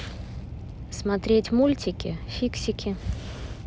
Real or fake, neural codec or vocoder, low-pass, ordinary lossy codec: real; none; none; none